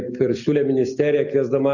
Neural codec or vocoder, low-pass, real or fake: none; 7.2 kHz; real